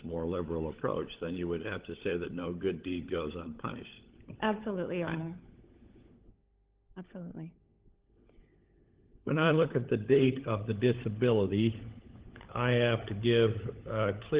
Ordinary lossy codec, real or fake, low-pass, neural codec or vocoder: Opus, 16 kbps; fake; 3.6 kHz; codec, 16 kHz, 16 kbps, FunCodec, trained on LibriTTS, 50 frames a second